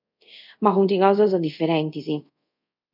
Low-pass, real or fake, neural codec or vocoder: 5.4 kHz; fake; codec, 24 kHz, 0.5 kbps, DualCodec